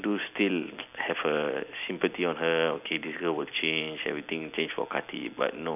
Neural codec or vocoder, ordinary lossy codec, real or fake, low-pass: none; none; real; 3.6 kHz